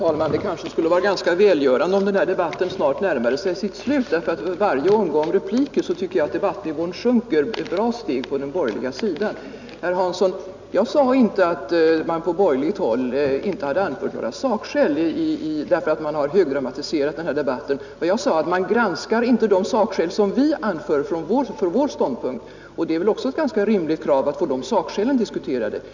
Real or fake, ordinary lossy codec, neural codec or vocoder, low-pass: real; none; none; 7.2 kHz